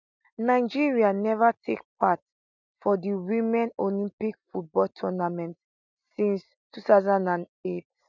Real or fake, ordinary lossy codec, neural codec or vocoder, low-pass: real; none; none; 7.2 kHz